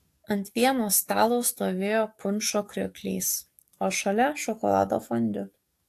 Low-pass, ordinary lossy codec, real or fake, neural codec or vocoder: 14.4 kHz; AAC, 64 kbps; fake; codec, 44.1 kHz, 7.8 kbps, DAC